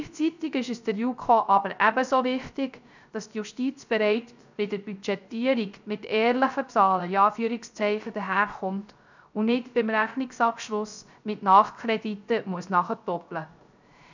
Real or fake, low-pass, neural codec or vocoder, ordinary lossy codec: fake; 7.2 kHz; codec, 16 kHz, 0.3 kbps, FocalCodec; none